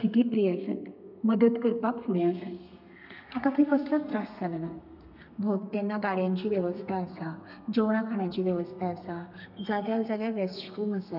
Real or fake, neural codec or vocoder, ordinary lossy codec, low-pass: fake; codec, 32 kHz, 1.9 kbps, SNAC; none; 5.4 kHz